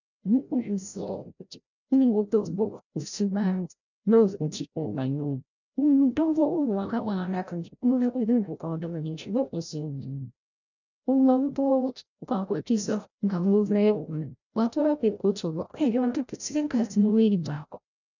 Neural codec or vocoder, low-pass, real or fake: codec, 16 kHz, 0.5 kbps, FreqCodec, larger model; 7.2 kHz; fake